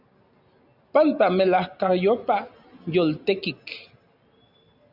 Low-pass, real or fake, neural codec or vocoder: 5.4 kHz; real; none